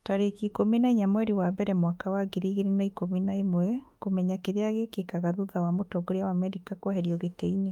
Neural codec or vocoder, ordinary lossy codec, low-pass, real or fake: autoencoder, 48 kHz, 32 numbers a frame, DAC-VAE, trained on Japanese speech; Opus, 32 kbps; 19.8 kHz; fake